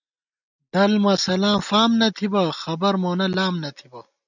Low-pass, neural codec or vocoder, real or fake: 7.2 kHz; none; real